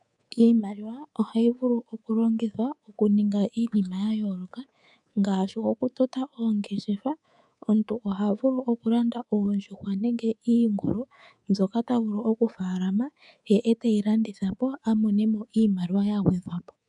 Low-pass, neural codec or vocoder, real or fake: 10.8 kHz; codec, 24 kHz, 3.1 kbps, DualCodec; fake